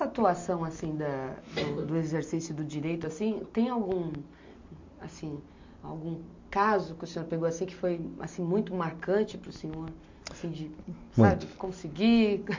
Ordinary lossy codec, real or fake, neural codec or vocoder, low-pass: MP3, 48 kbps; real; none; 7.2 kHz